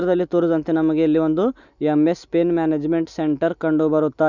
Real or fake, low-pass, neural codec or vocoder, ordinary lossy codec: real; 7.2 kHz; none; none